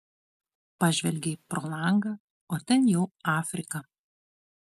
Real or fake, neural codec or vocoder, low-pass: real; none; 14.4 kHz